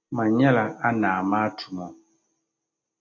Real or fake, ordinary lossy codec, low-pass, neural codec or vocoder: real; AAC, 48 kbps; 7.2 kHz; none